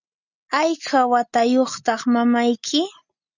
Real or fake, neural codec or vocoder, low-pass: real; none; 7.2 kHz